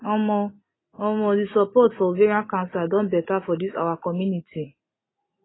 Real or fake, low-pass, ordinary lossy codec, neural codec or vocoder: real; 7.2 kHz; AAC, 16 kbps; none